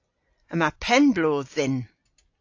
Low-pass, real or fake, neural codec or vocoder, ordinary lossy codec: 7.2 kHz; real; none; AAC, 48 kbps